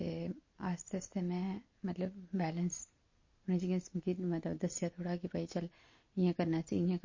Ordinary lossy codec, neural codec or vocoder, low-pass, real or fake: MP3, 32 kbps; none; 7.2 kHz; real